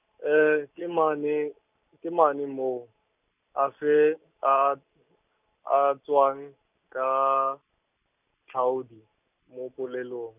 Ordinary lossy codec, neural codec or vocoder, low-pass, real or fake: none; none; 3.6 kHz; real